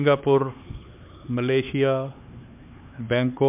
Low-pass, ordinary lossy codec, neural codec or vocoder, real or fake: 3.6 kHz; MP3, 32 kbps; codec, 16 kHz, 4 kbps, X-Codec, HuBERT features, trained on LibriSpeech; fake